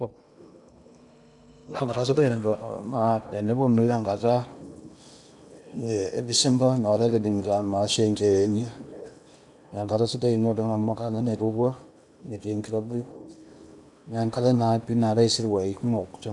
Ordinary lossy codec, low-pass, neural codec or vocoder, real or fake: none; 10.8 kHz; codec, 16 kHz in and 24 kHz out, 0.8 kbps, FocalCodec, streaming, 65536 codes; fake